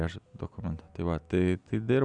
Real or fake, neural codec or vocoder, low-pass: real; none; 9.9 kHz